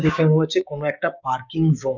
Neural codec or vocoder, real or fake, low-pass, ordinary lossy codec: codec, 16 kHz, 6 kbps, DAC; fake; 7.2 kHz; none